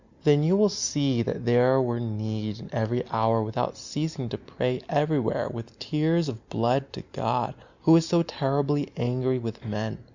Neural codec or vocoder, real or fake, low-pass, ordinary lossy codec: none; real; 7.2 kHz; Opus, 64 kbps